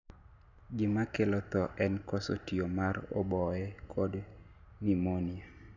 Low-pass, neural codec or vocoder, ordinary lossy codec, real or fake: 7.2 kHz; none; none; real